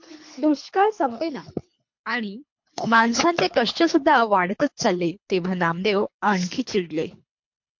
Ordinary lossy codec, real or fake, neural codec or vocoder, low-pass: MP3, 48 kbps; fake; codec, 24 kHz, 3 kbps, HILCodec; 7.2 kHz